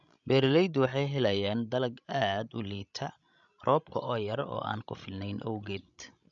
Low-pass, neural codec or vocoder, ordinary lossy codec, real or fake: 7.2 kHz; codec, 16 kHz, 16 kbps, FreqCodec, larger model; none; fake